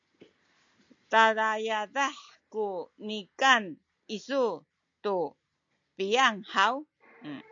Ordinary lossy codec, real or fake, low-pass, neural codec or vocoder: MP3, 64 kbps; real; 7.2 kHz; none